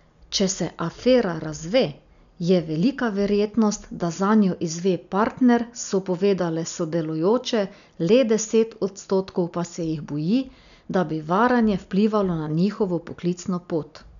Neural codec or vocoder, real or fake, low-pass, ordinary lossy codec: none; real; 7.2 kHz; none